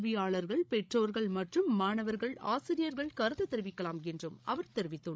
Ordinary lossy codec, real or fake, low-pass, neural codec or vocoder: none; fake; none; codec, 16 kHz, 16 kbps, FreqCodec, larger model